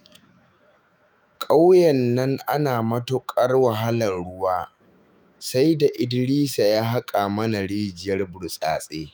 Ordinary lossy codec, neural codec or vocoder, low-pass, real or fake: none; autoencoder, 48 kHz, 128 numbers a frame, DAC-VAE, trained on Japanese speech; none; fake